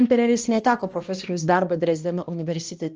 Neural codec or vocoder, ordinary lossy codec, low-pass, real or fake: codec, 16 kHz, 1 kbps, X-Codec, HuBERT features, trained on balanced general audio; Opus, 24 kbps; 7.2 kHz; fake